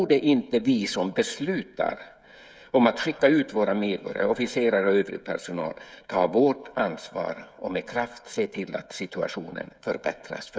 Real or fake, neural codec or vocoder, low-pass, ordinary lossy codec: fake; codec, 16 kHz, 16 kbps, FreqCodec, smaller model; none; none